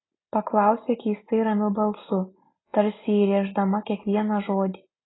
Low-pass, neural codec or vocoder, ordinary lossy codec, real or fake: 7.2 kHz; none; AAC, 16 kbps; real